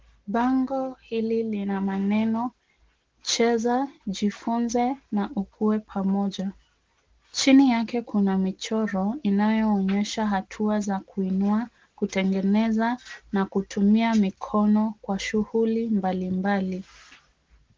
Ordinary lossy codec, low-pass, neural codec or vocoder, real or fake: Opus, 16 kbps; 7.2 kHz; none; real